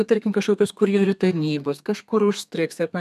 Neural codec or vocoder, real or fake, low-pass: codec, 32 kHz, 1.9 kbps, SNAC; fake; 14.4 kHz